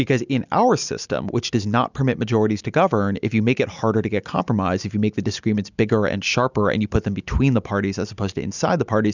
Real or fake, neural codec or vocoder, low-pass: real; none; 7.2 kHz